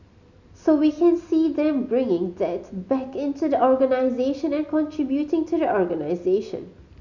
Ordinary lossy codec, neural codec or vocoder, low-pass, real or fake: none; none; 7.2 kHz; real